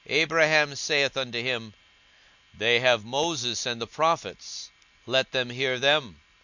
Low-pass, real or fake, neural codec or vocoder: 7.2 kHz; real; none